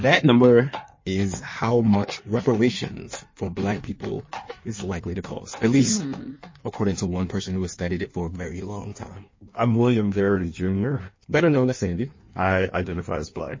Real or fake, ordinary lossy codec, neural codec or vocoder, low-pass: fake; MP3, 32 kbps; codec, 16 kHz in and 24 kHz out, 1.1 kbps, FireRedTTS-2 codec; 7.2 kHz